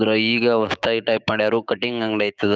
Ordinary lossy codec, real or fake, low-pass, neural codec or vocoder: none; fake; none; codec, 16 kHz, 6 kbps, DAC